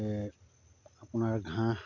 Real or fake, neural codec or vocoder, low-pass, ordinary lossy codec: real; none; 7.2 kHz; none